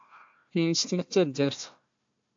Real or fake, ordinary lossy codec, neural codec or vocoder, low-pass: fake; AAC, 48 kbps; codec, 16 kHz, 1 kbps, FunCodec, trained on Chinese and English, 50 frames a second; 7.2 kHz